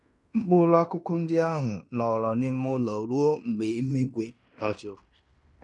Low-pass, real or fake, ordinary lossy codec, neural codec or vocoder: 10.8 kHz; fake; none; codec, 16 kHz in and 24 kHz out, 0.9 kbps, LongCat-Audio-Codec, fine tuned four codebook decoder